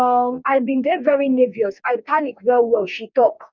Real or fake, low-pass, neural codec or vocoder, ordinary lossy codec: fake; 7.2 kHz; codec, 44.1 kHz, 2.6 kbps, DAC; none